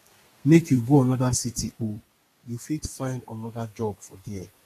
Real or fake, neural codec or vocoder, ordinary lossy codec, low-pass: fake; codec, 32 kHz, 1.9 kbps, SNAC; AAC, 48 kbps; 14.4 kHz